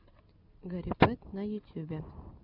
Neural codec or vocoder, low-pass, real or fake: none; 5.4 kHz; real